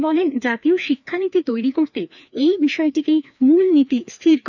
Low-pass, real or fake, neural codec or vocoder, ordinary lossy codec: 7.2 kHz; fake; codec, 16 kHz, 2 kbps, FreqCodec, larger model; none